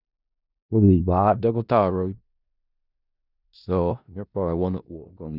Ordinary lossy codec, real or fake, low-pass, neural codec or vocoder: none; fake; 5.4 kHz; codec, 16 kHz in and 24 kHz out, 0.4 kbps, LongCat-Audio-Codec, four codebook decoder